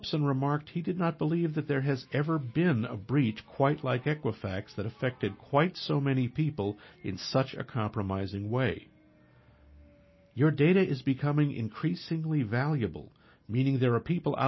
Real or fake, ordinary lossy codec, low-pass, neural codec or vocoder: real; MP3, 24 kbps; 7.2 kHz; none